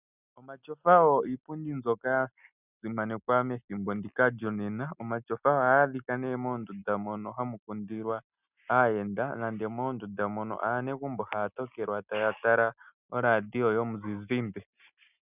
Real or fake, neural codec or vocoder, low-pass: real; none; 3.6 kHz